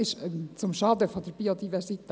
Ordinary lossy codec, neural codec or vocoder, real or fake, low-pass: none; none; real; none